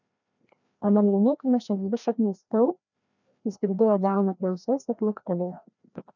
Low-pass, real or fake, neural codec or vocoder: 7.2 kHz; fake; codec, 16 kHz, 1 kbps, FreqCodec, larger model